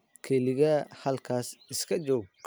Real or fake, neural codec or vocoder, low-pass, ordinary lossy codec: real; none; none; none